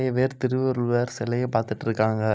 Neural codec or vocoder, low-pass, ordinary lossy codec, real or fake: none; none; none; real